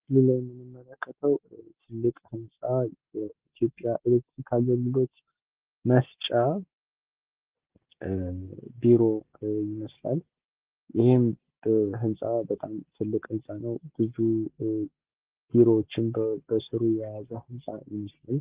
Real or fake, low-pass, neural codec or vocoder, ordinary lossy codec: real; 3.6 kHz; none; Opus, 16 kbps